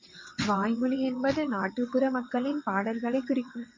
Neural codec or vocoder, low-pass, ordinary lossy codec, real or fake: vocoder, 22.05 kHz, 80 mel bands, WaveNeXt; 7.2 kHz; MP3, 32 kbps; fake